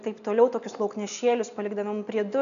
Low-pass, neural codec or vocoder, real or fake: 7.2 kHz; none; real